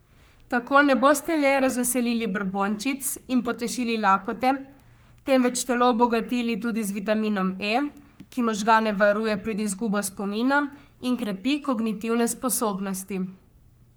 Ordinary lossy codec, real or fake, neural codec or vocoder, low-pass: none; fake; codec, 44.1 kHz, 3.4 kbps, Pupu-Codec; none